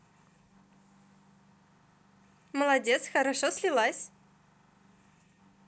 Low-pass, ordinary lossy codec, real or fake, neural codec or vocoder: none; none; real; none